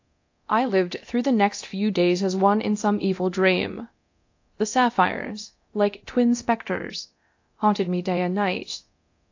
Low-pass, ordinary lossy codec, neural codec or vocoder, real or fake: 7.2 kHz; AAC, 48 kbps; codec, 24 kHz, 0.9 kbps, DualCodec; fake